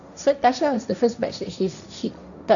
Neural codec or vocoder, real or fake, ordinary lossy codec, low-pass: codec, 16 kHz, 1.1 kbps, Voila-Tokenizer; fake; none; 7.2 kHz